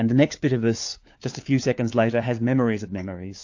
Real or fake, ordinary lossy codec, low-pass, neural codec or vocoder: fake; AAC, 48 kbps; 7.2 kHz; codec, 16 kHz, 4 kbps, FunCodec, trained on LibriTTS, 50 frames a second